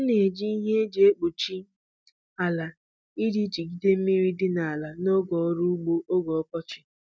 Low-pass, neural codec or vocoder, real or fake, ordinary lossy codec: none; none; real; none